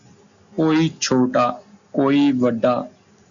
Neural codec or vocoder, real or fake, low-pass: none; real; 7.2 kHz